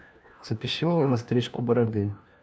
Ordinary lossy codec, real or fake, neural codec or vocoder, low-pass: none; fake; codec, 16 kHz, 1 kbps, FunCodec, trained on LibriTTS, 50 frames a second; none